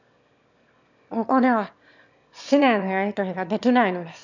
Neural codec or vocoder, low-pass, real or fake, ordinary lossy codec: autoencoder, 22.05 kHz, a latent of 192 numbers a frame, VITS, trained on one speaker; 7.2 kHz; fake; none